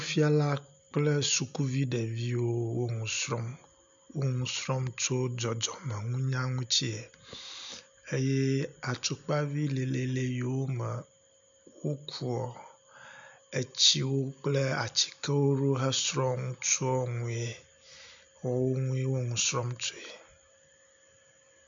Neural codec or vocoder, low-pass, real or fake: none; 7.2 kHz; real